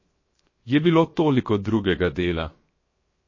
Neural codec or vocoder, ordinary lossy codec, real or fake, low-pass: codec, 16 kHz, 0.7 kbps, FocalCodec; MP3, 32 kbps; fake; 7.2 kHz